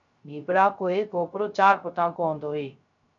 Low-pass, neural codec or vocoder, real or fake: 7.2 kHz; codec, 16 kHz, 0.3 kbps, FocalCodec; fake